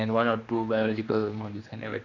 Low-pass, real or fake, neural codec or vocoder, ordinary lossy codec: 7.2 kHz; fake; codec, 16 kHz, 2 kbps, X-Codec, HuBERT features, trained on general audio; Opus, 64 kbps